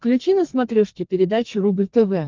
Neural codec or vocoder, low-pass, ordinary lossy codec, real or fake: codec, 32 kHz, 1.9 kbps, SNAC; 7.2 kHz; Opus, 32 kbps; fake